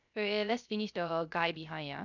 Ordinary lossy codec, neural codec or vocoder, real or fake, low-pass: none; codec, 16 kHz, 0.3 kbps, FocalCodec; fake; 7.2 kHz